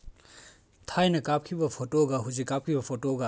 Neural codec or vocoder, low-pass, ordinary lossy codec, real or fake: none; none; none; real